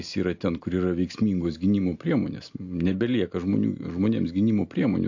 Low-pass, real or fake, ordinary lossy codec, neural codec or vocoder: 7.2 kHz; real; AAC, 48 kbps; none